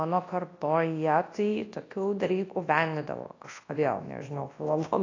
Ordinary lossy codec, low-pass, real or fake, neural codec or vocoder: AAC, 32 kbps; 7.2 kHz; fake; codec, 24 kHz, 0.9 kbps, WavTokenizer, large speech release